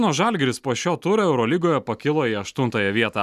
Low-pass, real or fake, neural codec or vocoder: 14.4 kHz; real; none